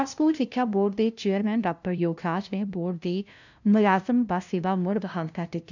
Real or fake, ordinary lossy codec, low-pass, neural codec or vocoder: fake; none; 7.2 kHz; codec, 16 kHz, 0.5 kbps, FunCodec, trained on LibriTTS, 25 frames a second